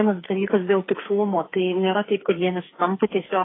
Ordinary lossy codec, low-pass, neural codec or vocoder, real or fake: AAC, 16 kbps; 7.2 kHz; codec, 44.1 kHz, 2.6 kbps, SNAC; fake